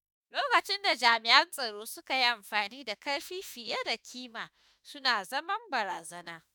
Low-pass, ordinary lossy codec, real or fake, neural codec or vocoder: none; none; fake; autoencoder, 48 kHz, 32 numbers a frame, DAC-VAE, trained on Japanese speech